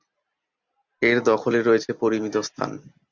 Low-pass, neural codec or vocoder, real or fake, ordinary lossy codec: 7.2 kHz; none; real; MP3, 64 kbps